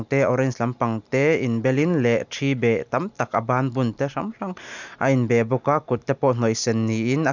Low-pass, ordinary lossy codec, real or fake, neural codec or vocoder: 7.2 kHz; none; real; none